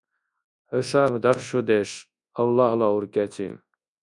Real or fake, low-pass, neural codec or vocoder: fake; 10.8 kHz; codec, 24 kHz, 0.9 kbps, WavTokenizer, large speech release